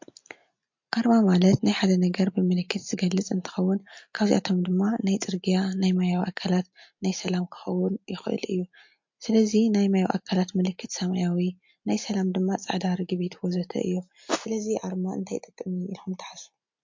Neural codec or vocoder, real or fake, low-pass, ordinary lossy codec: none; real; 7.2 kHz; MP3, 48 kbps